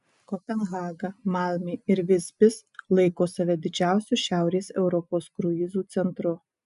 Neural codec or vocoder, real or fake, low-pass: none; real; 10.8 kHz